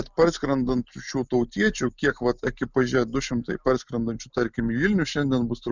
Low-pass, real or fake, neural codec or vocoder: 7.2 kHz; real; none